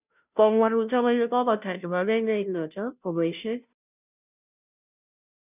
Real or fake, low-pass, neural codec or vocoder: fake; 3.6 kHz; codec, 16 kHz, 0.5 kbps, FunCodec, trained on Chinese and English, 25 frames a second